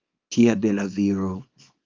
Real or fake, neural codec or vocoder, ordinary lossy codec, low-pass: fake; codec, 24 kHz, 0.9 kbps, WavTokenizer, small release; Opus, 24 kbps; 7.2 kHz